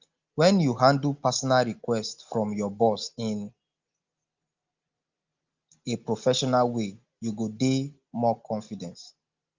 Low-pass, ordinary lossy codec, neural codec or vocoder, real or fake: 7.2 kHz; Opus, 32 kbps; none; real